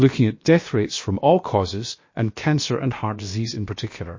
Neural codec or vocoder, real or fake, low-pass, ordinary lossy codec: codec, 16 kHz, about 1 kbps, DyCAST, with the encoder's durations; fake; 7.2 kHz; MP3, 32 kbps